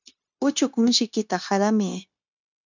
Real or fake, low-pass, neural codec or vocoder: fake; 7.2 kHz; codec, 16 kHz, 0.9 kbps, LongCat-Audio-Codec